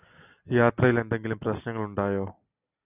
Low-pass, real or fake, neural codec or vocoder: 3.6 kHz; real; none